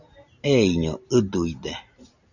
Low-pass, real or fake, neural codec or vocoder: 7.2 kHz; real; none